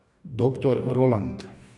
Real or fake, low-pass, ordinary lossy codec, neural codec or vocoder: fake; 10.8 kHz; none; codec, 44.1 kHz, 2.6 kbps, DAC